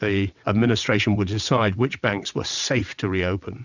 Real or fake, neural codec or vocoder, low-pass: fake; vocoder, 22.05 kHz, 80 mel bands, WaveNeXt; 7.2 kHz